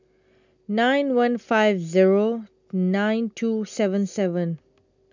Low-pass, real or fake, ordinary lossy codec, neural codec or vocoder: 7.2 kHz; real; none; none